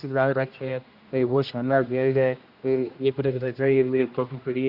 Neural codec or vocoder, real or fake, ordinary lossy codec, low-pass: codec, 16 kHz, 1 kbps, X-Codec, HuBERT features, trained on general audio; fake; Opus, 64 kbps; 5.4 kHz